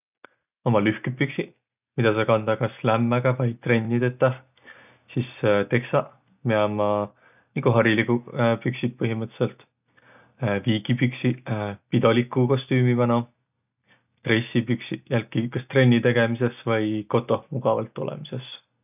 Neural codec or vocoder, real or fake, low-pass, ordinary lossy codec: none; real; 3.6 kHz; none